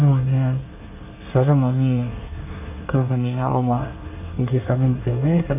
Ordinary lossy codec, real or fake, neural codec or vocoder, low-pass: none; fake; codec, 24 kHz, 1 kbps, SNAC; 3.6 kHz